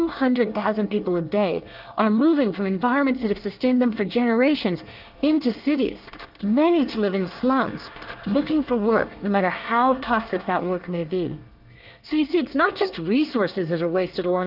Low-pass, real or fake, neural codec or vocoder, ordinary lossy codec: 5.4 kHz; fake; codec, 24 kHz, 1 kbps, SNAC; Opus, 32 kbps